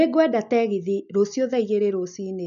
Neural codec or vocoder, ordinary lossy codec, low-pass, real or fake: none; none; 7.2 kHz; real